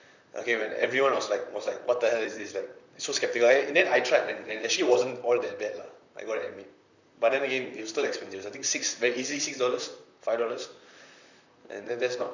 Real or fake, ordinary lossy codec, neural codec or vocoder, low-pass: fake; none; vocoder, 44.1 kHz, 128 mel bands, Pupu-Vocoder; 7.2 kHz